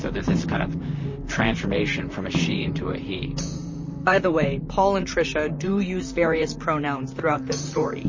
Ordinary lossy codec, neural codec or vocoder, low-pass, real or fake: MP3, 32 kbps; vocoder, 44.1 kHz, 128 mel bands, Pupu-Vocoder; 7.2 kHz; fake